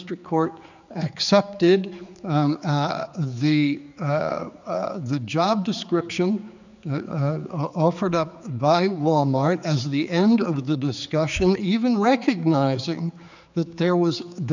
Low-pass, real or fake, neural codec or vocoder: 7.2 kHz; fake; codec, 16 kHz, 4 kbps, X-Codec, HuBERT features, trained on general audio